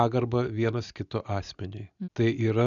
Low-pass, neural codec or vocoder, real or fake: 7.2 kHz; none; real